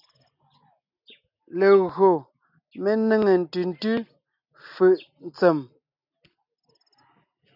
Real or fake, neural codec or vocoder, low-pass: real; none; 5.4 kHz